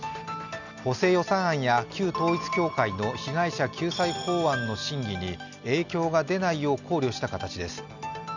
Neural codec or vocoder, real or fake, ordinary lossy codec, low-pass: none; real; none; 7.2 kHz